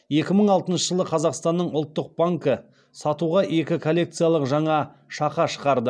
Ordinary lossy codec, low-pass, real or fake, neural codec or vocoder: none; none; real; none